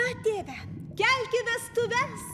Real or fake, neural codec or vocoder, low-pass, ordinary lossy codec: real; none; 14.4 kHz; AAC, 96 kbps